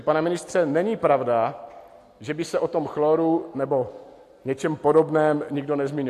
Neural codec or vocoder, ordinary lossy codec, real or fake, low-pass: none; AAC, 64 kbps; real; 14.4 kHz